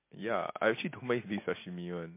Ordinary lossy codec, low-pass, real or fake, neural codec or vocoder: MP3, 24 kbps; 3.6 kHz; real; none